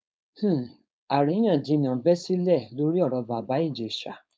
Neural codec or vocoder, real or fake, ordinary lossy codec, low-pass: codec, 16 kHz, 4.8 kbps, FACodec; fake; none; none